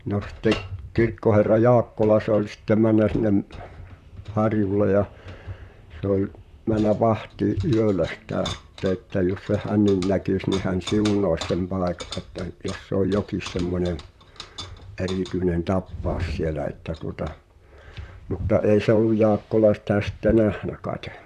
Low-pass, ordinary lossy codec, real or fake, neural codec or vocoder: 14.4 kHz; none; fake; vocoder, 44.1 kHz, 128 mel bands, Pupu-Vocoder